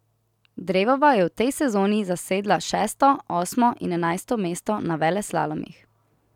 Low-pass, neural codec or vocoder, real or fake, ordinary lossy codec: 19.8 kHz; none; real; none